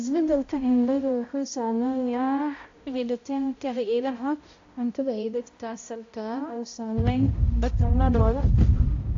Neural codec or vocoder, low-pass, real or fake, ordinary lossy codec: codec, 16 kHz, 0.5 kbps, X-Codec, HuBERT features, trained on balanced general audio; 7.2 kHz; fake; AAC, 48 kbps